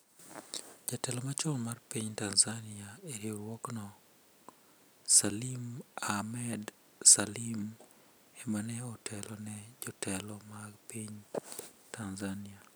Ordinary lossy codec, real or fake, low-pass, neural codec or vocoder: none; real; none; none